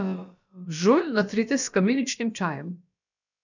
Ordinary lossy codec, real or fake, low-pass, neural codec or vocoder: none; fake; 7.2 kHz; codec, 16 kHz, about 1 kbps, DyCAST, with the encoder's durations